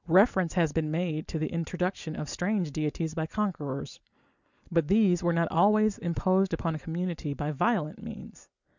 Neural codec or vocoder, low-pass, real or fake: none; 7.2 kHz; real